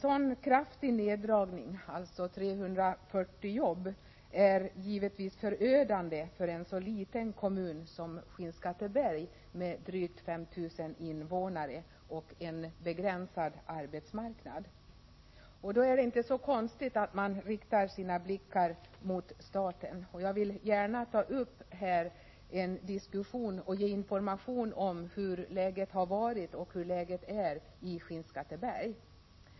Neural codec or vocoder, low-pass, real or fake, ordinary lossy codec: none; 7.2 kHz; real; MP3, 24 kbps